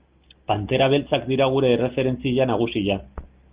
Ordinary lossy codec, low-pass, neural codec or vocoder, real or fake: Opus, 32 kbps; 3.6 kHz; none; real